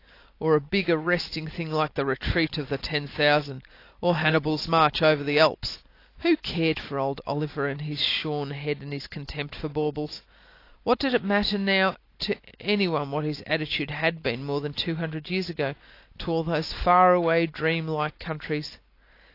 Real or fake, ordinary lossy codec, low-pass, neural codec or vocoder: real; AAC, 32 kbps; 5.4 kHz; none